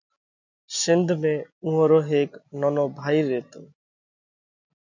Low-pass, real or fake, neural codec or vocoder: 7.2 kHz; real; none